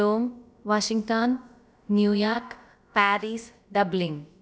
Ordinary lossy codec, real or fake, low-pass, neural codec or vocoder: none; fake; none; codec, 16 kHz, about 1 kbps, DyCAST, with the encoder's durations